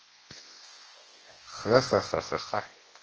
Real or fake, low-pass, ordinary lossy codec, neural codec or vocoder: fake; 7.2 kHz; Opus, 16 kbps; codec, 24 kHz, 0.9 kbps, WavTokenizer, large speech release